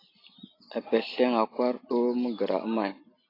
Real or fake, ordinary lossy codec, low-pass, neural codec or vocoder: real; AAC, 24 kbps; 5.4 kHz; none